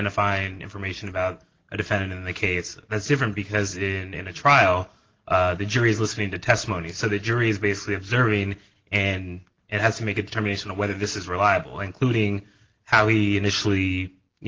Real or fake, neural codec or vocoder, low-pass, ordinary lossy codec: real; none; 7.2 kHz; Opus, 16 kbps